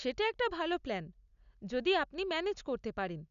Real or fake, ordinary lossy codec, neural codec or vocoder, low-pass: real; none; none; 7.2 kHz